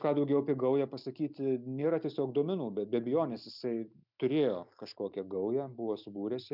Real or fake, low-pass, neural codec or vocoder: real; 5.4 kHz; none